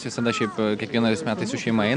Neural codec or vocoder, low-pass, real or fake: none; 9.9 kHz; real